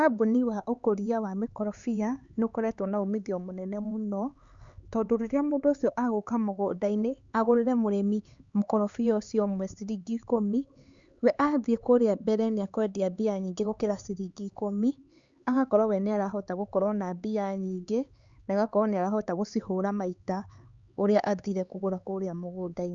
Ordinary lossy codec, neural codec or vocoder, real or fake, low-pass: Opus, 64 kbps; codec, 16 kHz, 4 kbps, X-Codec, HuBERT features, trained on LibriSpeech; fake; 7.2 kHz